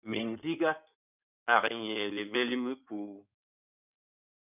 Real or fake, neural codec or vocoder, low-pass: fake; codec, 16 kHz in and 24 kHz out, 2.2 kbps, FireRedTTS-2 codec; 3.6 kHz